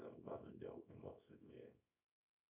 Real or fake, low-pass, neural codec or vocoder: fake; 3.6 kHz; codec, 24 kHz, 0.9 kbps, WavTokenizer, small release